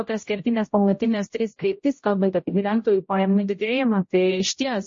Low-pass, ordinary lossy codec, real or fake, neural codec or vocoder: 7.2 kHz; MP3, 32 kbps; fake; codec, 16 kHz, 0.5 kbps, X-Codec, HuBERT features, trained on general audio